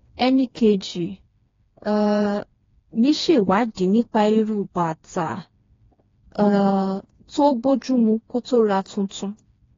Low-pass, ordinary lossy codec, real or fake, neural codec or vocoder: 7.2 kHz; AAC, 32 kbps; fake; codec, 16 kHz, 2 kbps, FreqCodec, smaller model